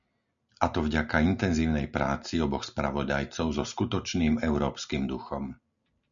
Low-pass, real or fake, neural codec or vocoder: 7.2 kHz; real; none